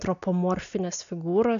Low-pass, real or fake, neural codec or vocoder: 7.2 kHz; real; none